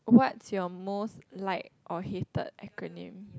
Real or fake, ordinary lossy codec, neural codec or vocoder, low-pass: real; none; none; none